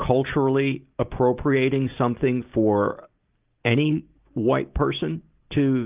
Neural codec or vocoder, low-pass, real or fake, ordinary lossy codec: none; 3.6 kHz; real; Opus, 24 kbps